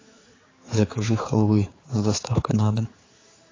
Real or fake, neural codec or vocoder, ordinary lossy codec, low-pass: fake; codec, 16 kHz, 4 kbps, X-Codec, HuBERT features, trained on general audio; AAC, 32 kbps; 7.2 kHz